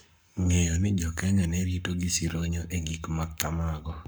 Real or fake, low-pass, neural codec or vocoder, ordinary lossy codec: fake; none; codec, 44.1 kHz, 7.8 kbps, Pupu-Codec; none